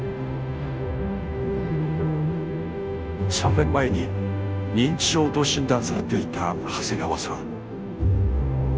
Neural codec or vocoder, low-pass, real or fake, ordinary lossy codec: codec, 16 kHz, 0.5 kbps, FunCodec, trained on Chinese and English, 25 frames a second; none; fake; none